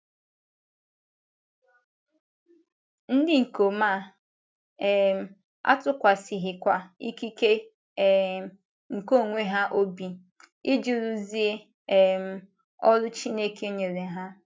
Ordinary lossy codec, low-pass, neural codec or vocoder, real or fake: none; none; none; real